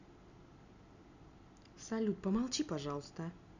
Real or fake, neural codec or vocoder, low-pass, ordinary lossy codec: real; none; 7.2 kHz; none